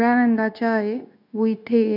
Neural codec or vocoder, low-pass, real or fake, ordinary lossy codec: codec, 16 kHz, 0.9 kbps, LongCat-Audio-Codec; 5.4 kHz; fake; none